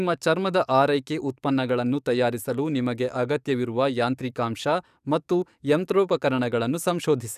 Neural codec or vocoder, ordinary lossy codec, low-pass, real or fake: codec, 44.1 kHz, 7.8 kbps, DAC; none; 14.4 kHz; fake